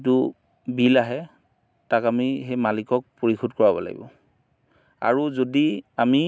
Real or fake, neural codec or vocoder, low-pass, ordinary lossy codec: real; none; none; none